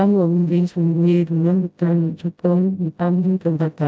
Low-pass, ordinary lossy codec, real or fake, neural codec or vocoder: none; none; fake; codec, 16 kHz, 0.5 kbps, FreqCodec, smaller model